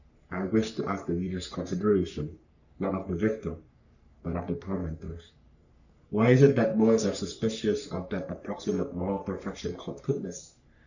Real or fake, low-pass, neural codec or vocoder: fake; 7.2 kHz; codec, 44.1 kHz, 3.4 kbps, Pupu-Codec